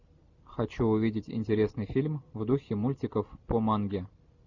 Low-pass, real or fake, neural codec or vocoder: 7.2 kHz; real; none